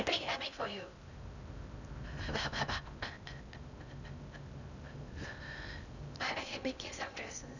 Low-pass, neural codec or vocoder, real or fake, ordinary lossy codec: 7.2 kHz; codec, 16 kHz in and 24 kHz out, 0.6 kbps, FocalCodec, streaming, 4096 codes; fake; none